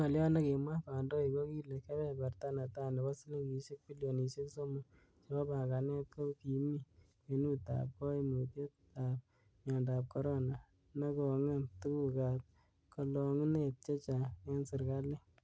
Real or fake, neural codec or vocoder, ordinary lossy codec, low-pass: real; none; none; none